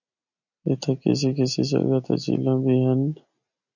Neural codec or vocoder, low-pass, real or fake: none; 7.2 kHz; real